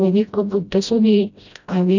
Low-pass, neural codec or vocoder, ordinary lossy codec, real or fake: 7.2 kHz; codec, 16 kHz, 0.5 kbps, FreqCodec, smaller model; none; fake